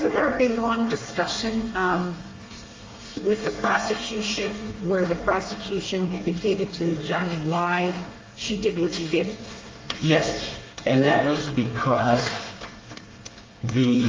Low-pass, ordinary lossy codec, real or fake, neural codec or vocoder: 7.2 kHz; Opus, 32 kbps; fake; codec, 24 kHz, 1 kbps, SNAC